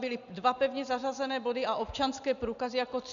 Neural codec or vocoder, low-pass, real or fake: none; 7.2 kHz; real